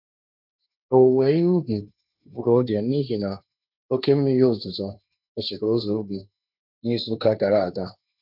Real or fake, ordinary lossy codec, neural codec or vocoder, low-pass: fake; none; codec, 16 kHz, 1.1 kbps, Voila-Tokenizer; 5.4 kHz